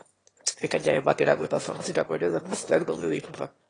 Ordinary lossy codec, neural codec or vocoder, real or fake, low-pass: AAC, 32 kbps; autoencoder, 22.05 kHz, a latent of 192 numbers a frame, VITS, trained on one speaker; fake; 9.9 kHz